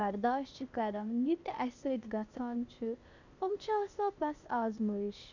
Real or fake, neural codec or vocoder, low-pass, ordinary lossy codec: fake; codec, 16 kHz, 0.8 kbps, ZipCodec; 7.2 kHz; none